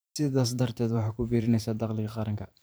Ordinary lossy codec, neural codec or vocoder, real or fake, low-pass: none; vocoder, 44.1 kHz, 128 mel bands every 512 samples, BigVGAN v2; fake; none